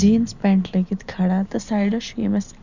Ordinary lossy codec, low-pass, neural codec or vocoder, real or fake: none; 7.2 kHz; none; real